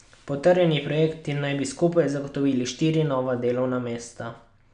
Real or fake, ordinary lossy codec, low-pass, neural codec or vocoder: real; none; 9.9 kHz; none